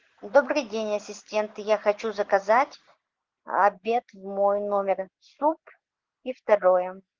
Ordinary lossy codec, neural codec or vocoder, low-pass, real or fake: Opus, 16 kbps; none; 7.2 kHz; real